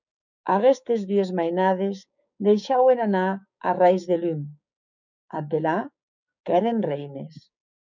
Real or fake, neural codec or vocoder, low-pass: fake; codec, 16 kHz, 6 kbps, DAC; 7.2 kHz